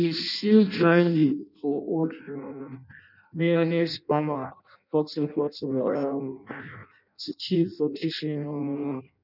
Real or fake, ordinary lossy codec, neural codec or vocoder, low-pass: fake; none; codec, 16 kHz in and 24 kHz out, 0.6 kbps, FireRedTTS-2 codec; 5.4 kHz